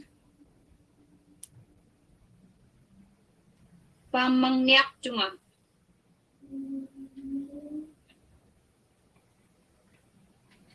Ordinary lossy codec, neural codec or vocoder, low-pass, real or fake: Opus, 16 kbps; none; 10.8 kHz; real